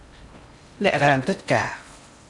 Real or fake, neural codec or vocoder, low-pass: fake; codec, 16 kHz in and 24 kHz out, 0.6 kbps, FocalCodec, streaming, 4096 codes; 10.8 kHz